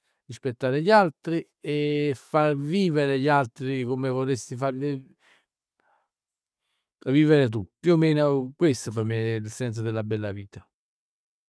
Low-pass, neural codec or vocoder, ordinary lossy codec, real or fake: none; none; none; real